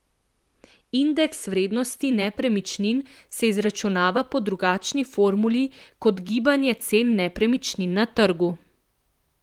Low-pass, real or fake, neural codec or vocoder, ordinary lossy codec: 19.8 kHz; fake; vocoder, 44.1 kHz, 128 mel bands, Pupu-Vocoder; Opus, 24 kbps